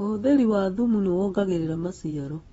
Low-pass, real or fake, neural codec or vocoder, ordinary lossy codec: 10.8 kHz; fake; vocoder, 24 kHz, 100 mel bands, Vocos; AAC, 24 kbps